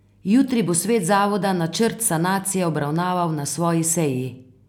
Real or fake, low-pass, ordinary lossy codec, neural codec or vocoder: real; 19.8 kHz; none; none